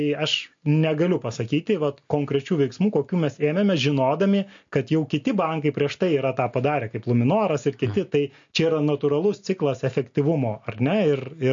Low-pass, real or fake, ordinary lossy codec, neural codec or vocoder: 7.2 kHz; real; MP3, 48 kbps; none